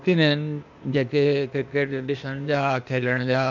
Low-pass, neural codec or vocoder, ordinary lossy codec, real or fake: 7.2 kHz; codec, 16 kHz, 0.8 kbps, ZipCodec; none; fake